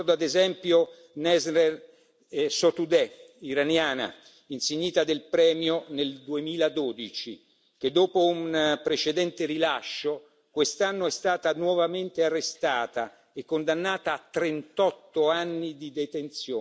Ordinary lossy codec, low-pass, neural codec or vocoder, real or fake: none; none; none; real